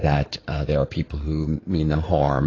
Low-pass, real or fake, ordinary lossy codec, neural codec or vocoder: 7.2 kHz; fake; AAC, 32 kbps; codec, 16 kHz in and 24 kHz out, 2.2 kbps, FireRedTTS-2 codec